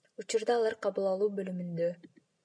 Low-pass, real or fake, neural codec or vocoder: 9.9 kHz; real; none